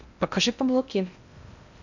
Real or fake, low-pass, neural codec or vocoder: fake; 7.2 kHz; codec, 16 kHz in and 24 kHz out, 0.6 kbps, FocalCodec, streaming, 2048 codes